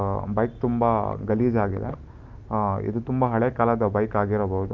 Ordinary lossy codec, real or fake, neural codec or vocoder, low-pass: Opus, 24 kbps; real; none; 7.2 kHz